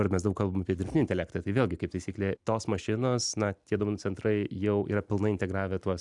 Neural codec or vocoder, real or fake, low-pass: none; real; 10.8 kHz